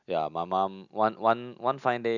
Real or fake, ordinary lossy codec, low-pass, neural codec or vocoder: real; none; 7.2 kHz; none